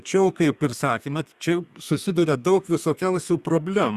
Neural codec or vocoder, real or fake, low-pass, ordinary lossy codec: codec, 32 kHz, 1.9 kbps, SNAC; fake; 14.4 kHz; Opus, 64 kbps